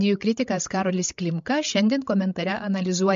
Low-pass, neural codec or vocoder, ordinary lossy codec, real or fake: 7.2 kHz; codec, 16 kHz, 16 kbps, FreqCodec, larger model; MP3, 48 kbps; fake